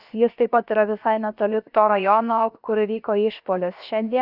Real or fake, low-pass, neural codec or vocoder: fake; 5.4 kHz; codec, 16 kHz, about 1 kbps, DyCAST, with the encoder's durations